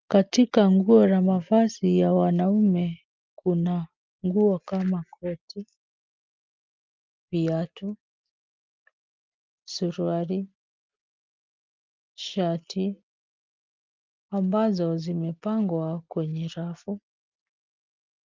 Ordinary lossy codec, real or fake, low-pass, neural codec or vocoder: Opus, 24 kbps; real; 7.2 kHz; none